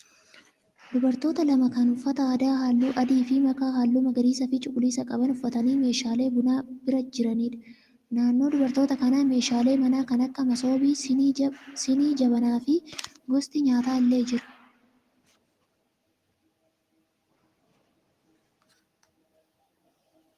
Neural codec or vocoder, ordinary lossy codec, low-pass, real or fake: none; Opus, 24 kbps; 14.4 kHz; real